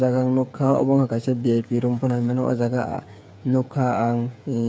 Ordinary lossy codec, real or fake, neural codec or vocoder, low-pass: none; fake; codec, 16 kHz, 8 kbps, FreqCodec, smaller model; none